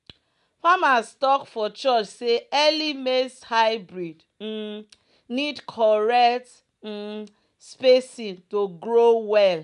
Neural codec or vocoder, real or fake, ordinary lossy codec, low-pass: none; real; none; 10.8 kHz